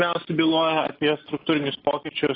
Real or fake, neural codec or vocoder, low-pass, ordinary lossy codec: real; none; 5.4 kHz; AAC, 24 kbps